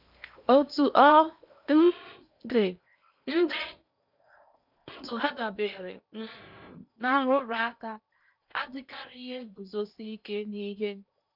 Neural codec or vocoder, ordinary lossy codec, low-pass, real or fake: codec, 16 kHz in and 24 kHz out, 0.8 kbps, FocalCodec, streaming, 65536 codes; none; 5.4 kHz; fake